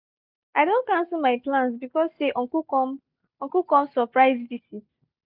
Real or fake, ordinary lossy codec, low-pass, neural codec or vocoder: real; none; 5.4 kHz; none